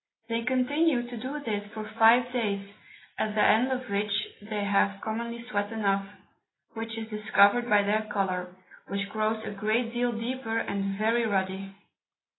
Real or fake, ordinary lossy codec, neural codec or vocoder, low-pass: real; AAC, 16 kbps; none; 7.2 kHz